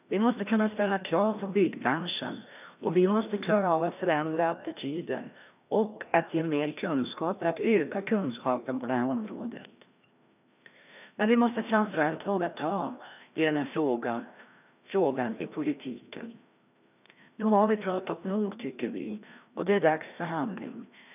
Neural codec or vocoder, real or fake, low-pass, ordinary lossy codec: codec, 16 kHz, 1 kbps, FreqCodec, larger model; fake; 3.6 kHz; none